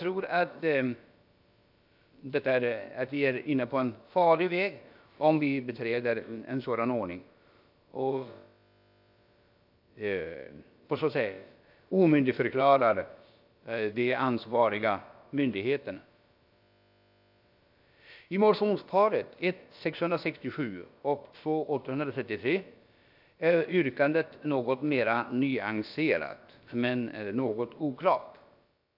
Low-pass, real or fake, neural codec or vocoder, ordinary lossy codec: 5.4 kHz; fake; codec, 16 kHz, about 1 kbps, DyCAST, with the encoder's durations; AAC, 48 kbps